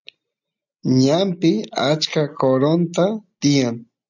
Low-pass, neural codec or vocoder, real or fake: 7.2 kHz; none; real